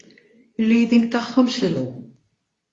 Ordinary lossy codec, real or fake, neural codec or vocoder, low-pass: AAC, 32 kbps; fake; codec, 24 kHz, 0.9 kbps, WavTokenizer, medium speech release version 2; 10.8 kHz